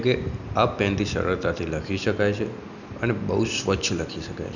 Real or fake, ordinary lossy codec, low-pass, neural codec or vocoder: real; none; 7.2 kHz; none